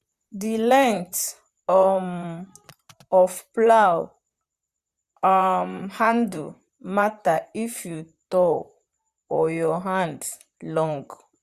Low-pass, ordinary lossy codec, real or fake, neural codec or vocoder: 14.4 kHz; Opus, 64 kbps; fake; vocoder, 44.1 kHz, 128 mel bands, Pupu-Vocoder